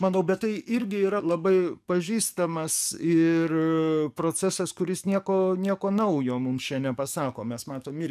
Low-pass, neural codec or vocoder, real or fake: 14.4 kHz; codec, 44.1 kHz, 7.8 kbps, DAC; fake